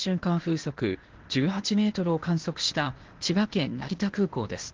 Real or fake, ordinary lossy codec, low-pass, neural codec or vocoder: fake; Opus, 16 kbps; 7.2 kHz; codec, 16 kHz, 0.8 kbps, ZipCodec